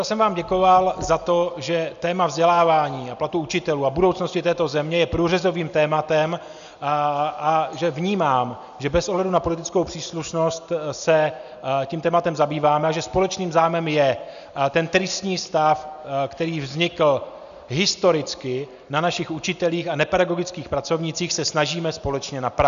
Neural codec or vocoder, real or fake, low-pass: none; real; 7.2 kHz